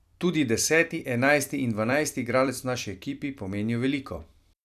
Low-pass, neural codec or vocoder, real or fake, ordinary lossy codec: 14.4 kHz; vocoder, 44.1 kHz, 128 mel bands every 256 samples, BigVGAN v2; fake; none